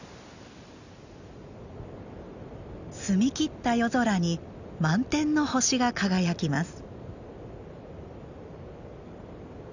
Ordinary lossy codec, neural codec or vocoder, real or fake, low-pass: none; none; real; 7.2 kHz